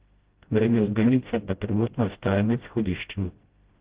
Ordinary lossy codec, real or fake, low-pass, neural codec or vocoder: Opus, 16 kbps; fake; 3.6 kHz; codec, 16 kHz, 0.5 kbps, FreqCodec, smaller model